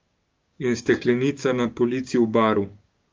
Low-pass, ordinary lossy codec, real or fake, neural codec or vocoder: 7.2 kHz; Opus, 32 kbps; fake; codec, 16 kHz, 6 kbps, DAC